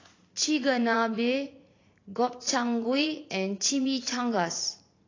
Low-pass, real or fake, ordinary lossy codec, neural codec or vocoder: 7.2 kHz; fake; AAC, 32 kbps; vocoder, 44.1 kHz, 80 mel bands, Vocos